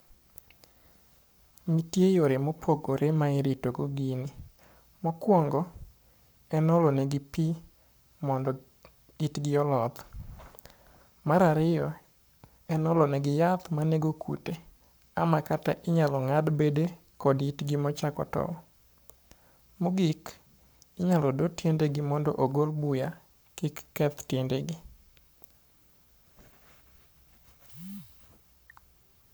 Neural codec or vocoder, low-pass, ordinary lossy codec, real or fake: codec, 44.1 kHz, 7.8 kbps, Pupu-Codec; none; none; fake